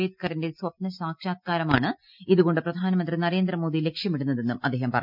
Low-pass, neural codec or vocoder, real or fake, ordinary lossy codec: 5.4 kHz; none; real; none